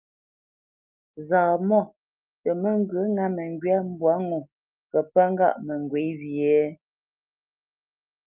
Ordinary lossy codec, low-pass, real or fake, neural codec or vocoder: Opus, 24 kbps; 3.6 kHz; real; none